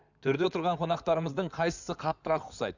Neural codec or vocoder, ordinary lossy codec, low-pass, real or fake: codec, 16 kHz in and 24 kHz out, 2.2 kbps, FireRedTTS-2 codec; none; 7.2 kHz; fake